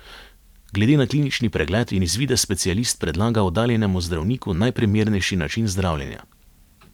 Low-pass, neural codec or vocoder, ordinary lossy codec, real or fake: 19.8 kHz; vocoder, 44.1 kHz, 128 mel bands every 512 samples, BigVGAN v2; none; fake